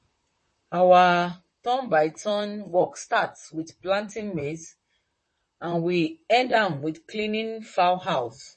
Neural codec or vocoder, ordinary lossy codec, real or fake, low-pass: vocoder, 44.1 kHz, 128 mel bands, Pupu-Vocoder; MP3, 32 kbps; fake; 10.8 kHz